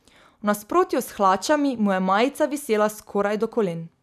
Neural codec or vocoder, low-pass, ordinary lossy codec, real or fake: none; 14.4 kHz; none; real